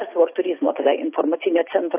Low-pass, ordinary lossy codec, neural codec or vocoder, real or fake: 3.6 kHz; AAC, 24 kbps; none; real